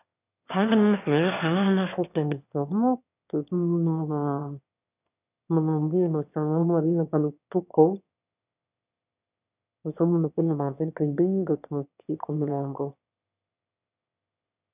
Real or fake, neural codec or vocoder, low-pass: fake; autoencoder, 22.05 kHz, a latent of 192 numbers a frame, VITS, trained on one speaker; 3.6 kHz